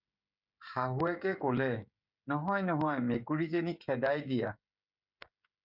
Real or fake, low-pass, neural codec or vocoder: fake; 5.4 kHz; codec, 16 kHz, 16 kbps, FreqCodec, smaller model